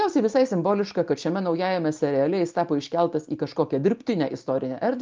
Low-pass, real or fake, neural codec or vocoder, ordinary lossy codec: 7.2 kHz; real; none; Opus, 24 kbps